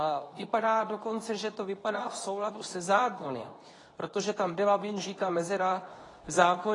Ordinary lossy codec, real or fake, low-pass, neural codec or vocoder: AAC, 32 kbps; fake; 10.8 kHz; codec, 24 kHz, 0.9 kbps, WavTokenizer, medium speech release version 2